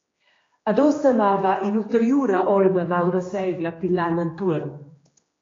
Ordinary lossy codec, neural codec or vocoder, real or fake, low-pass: AAC, 32 kbps; codec, 16 kHz, 2 kbps, X-Codec, HuBERT features, trained on general audio; fake; 7.2 kHz